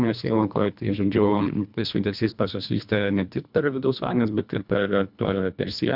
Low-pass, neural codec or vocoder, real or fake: 5.4 kHz; codec, 24 kHz, 1.5 kbps, HILCodec; fake